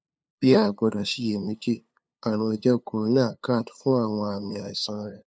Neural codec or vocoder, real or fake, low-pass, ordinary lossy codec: codec, 16 kHz, 2 kbps, FunCodec, trained on LibriTTS, 25 frames a second; fake; none; none